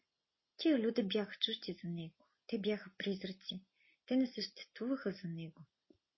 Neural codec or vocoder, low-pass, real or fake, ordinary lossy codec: none; 7.2 kHz; real; MP3, 24 kbps